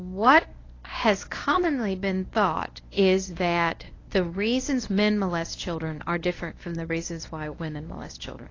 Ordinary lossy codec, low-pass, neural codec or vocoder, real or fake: AAC, 32 kbps; 7.2 kHz; codec, 24 kHz, 0.9 kbps, WavTokenizer, medium speech release version 1; fake